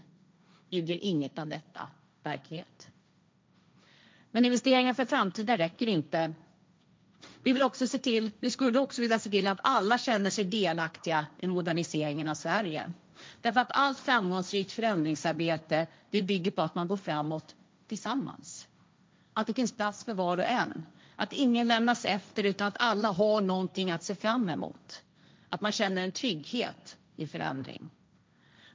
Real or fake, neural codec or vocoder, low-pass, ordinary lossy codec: fake; codec, 16 kHz, 1.1 kbps, Voila-Tokenizer; none; none